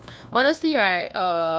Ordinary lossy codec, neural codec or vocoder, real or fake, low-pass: none; codec, 16 kHz, 1 kbps, FunCodec, trained on LibriTTS, 50 frames a second; fake; none